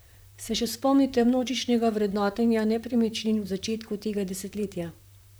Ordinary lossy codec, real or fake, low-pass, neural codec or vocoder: none; fake; none; vocoder, 44.1 kHz, 128 mel bands, Pupu-Vocoder